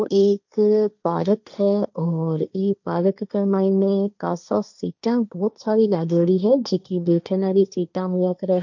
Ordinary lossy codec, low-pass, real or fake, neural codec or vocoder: none; 7.2 kHz; fake; codec, 16 kHz, 1.1 kbps, Voila-Tokenizer